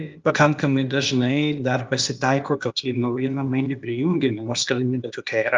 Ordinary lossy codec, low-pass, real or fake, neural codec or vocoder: Opus, 24 kbps; 7.2 kHz; fake; codec, 16 kHz, 0.8 kbps, ZipCodec